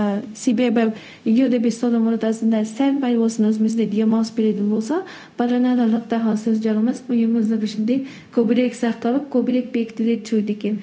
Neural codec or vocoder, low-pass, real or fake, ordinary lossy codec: codec, 16 kHz, 0.4 kbps, LongCat-Audio-Codec; none; fake; none